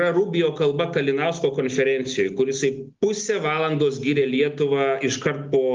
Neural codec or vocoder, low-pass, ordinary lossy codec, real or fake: none; 7.2 kHz; Opus, 32 kbps; real